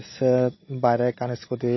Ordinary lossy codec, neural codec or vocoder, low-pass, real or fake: MP3, 24 kbps; none; 7.2 kHz; real